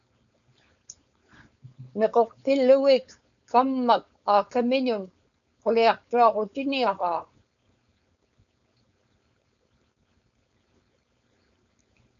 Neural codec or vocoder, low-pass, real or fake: codec, 16 kHz, 4.8 kbps, FACodec; 7.2 kHz; fake